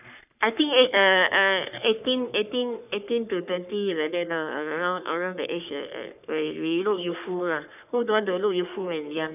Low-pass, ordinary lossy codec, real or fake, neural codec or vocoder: 3.6 kHz; none; fake; codec, 44.1 kHz, 3.4 kbps, Pupu-Codec